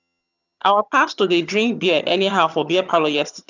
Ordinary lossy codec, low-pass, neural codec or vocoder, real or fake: none; 7.2 kHz; vocoder, 22.05 kHz, 80 mel bands, HiFi-GAN; fake